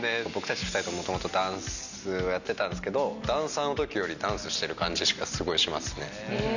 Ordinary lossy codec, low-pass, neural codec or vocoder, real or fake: none; 7.2 kHz; none; real